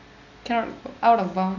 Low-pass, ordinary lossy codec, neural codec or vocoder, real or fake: 7.2 kHz; none; none; real